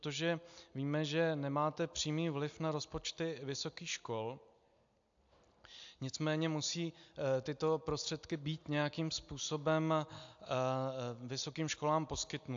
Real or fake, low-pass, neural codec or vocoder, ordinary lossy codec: real; 7.2 kHz; none; AAC, 64 kbps